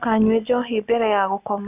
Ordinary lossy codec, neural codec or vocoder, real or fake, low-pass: none; none; real; 3.6 kHz